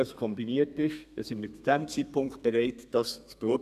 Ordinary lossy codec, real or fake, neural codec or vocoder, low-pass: none; fake; codec, 32 kHz, 1.9 kbps, SNAC; 14.4 kHz